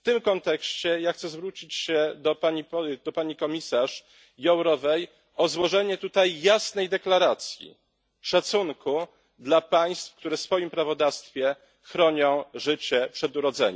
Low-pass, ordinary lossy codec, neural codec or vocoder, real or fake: none; none; none; real